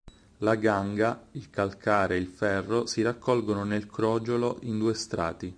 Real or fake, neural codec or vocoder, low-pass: real; none; 9.9 kHz